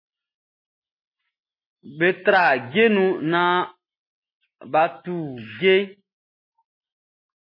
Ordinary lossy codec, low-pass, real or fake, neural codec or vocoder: MP3, 24 kbps; 5.4 kHz; real; none